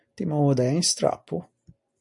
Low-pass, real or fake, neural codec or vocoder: 10.8 kHz; real; none